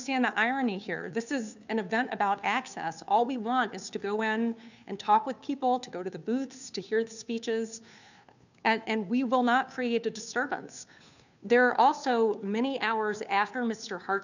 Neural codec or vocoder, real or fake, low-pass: codec, 16 kHz, 2 kbps, FunCodec, trained on Chinese and English, 25 frames a second; fake; 7.2 kHz